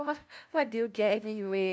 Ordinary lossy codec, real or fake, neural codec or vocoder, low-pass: none; fake; codec, 16 kHz, 0.5 kbps, FunCodec, trained on LibriTTS, 25 frames a second; none